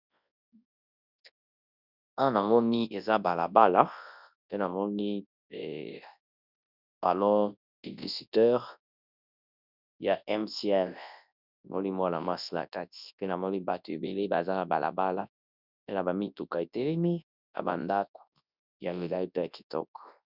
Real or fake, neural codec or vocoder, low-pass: fake; codec, 24 kHz, 0.9 kbps, WavTokenizer, large speech release; 5.4 kHz